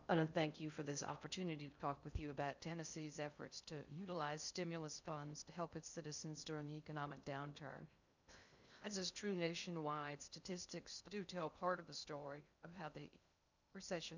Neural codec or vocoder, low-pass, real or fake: codec, 16 kHz in and 24 kHz out, 0.6 kbps, FocalCodec, streaming, 2048 codes; 7.2 kHz; fake